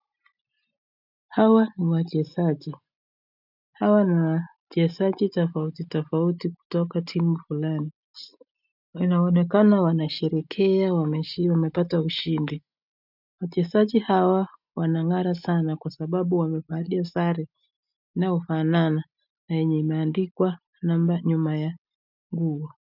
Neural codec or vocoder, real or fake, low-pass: none; real; 5.4 kHz